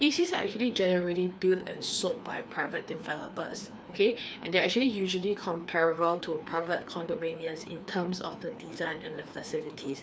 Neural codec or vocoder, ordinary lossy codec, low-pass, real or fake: codec, 16 kHz, 2 kbps, FreqCodec, larger model; none; none; fake